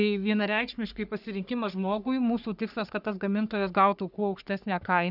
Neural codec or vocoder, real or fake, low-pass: codec, 44.1 kHz, 3.4 kbps, Pupu-Codec; fake; 5.4 kHz